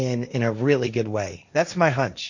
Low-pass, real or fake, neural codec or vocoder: 7.2 kHz; fake; codec, 16 kHz, 1.1 kbps, Voila-Tokenizer